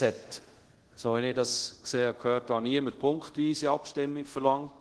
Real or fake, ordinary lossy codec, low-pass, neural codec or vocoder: fake; Opus, 16 kbps; 10.8 kHz; codec, 24 kHz, 0.5 kbps, DualCodec